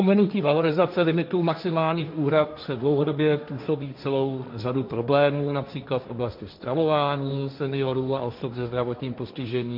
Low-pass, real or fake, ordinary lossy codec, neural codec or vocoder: 5.4 kHz; fake; MP3, 48 kbps; codec, 16 kHz, 1.1 kbps, Voila-Tokenizer